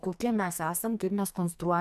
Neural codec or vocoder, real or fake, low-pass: codec, 44.1 kHz, 2.6 kbps, SNAC; fake; 14.4 kHz